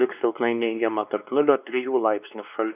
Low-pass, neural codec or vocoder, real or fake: 3.6 kHz; codec, 16 kHz, 2 kbps, X-Codec, WavLM features, trained on Multilingual LibriSpeech; fake